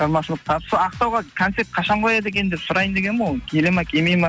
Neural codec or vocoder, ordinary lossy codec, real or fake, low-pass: none; none; real; none